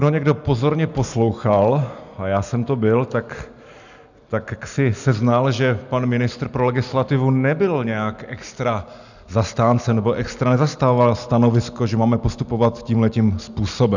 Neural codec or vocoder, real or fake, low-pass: none; real; 7.2 kHz